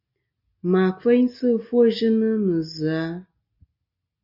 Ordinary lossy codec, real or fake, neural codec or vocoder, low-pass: MP3, 48 kbps; real; none; 5.4 kHz